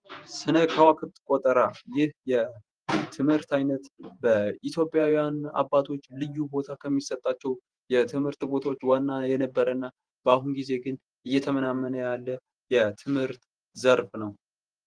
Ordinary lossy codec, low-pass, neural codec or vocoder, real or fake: Opus, 24 kbps; 9.9 kHz; vocoder, 48 kHz, 128 mel bands, Vocos; fake